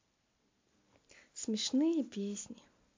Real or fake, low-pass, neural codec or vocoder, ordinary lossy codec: real; 7.2 kHz; none; MP3, 48 kbps